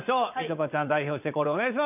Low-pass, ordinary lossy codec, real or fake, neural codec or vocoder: 3.6 kHz; none; fake; vocoder, 22.05 kHz, 80 mel bands, Vocos